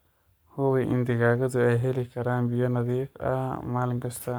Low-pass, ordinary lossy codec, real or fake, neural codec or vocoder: none; none; fake; codec, 44.1 kHz, 7.8 kbps, Pupu-Codec